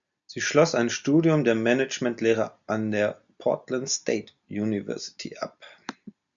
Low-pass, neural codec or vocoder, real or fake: 7.2 kHz; none; real